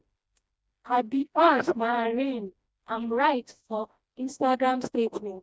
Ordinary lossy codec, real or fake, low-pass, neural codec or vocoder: none; fake; none; codec, 16 kHz, 1 kbps, FreqCodec, smaller model